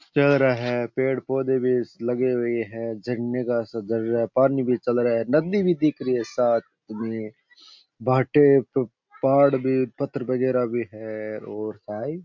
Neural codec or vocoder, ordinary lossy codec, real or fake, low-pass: none; MP3, 48 kbps; real; 7.2 kHz